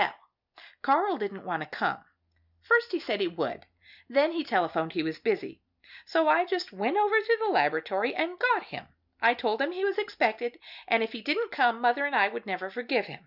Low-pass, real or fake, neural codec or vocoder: 5.4 kHz; real; none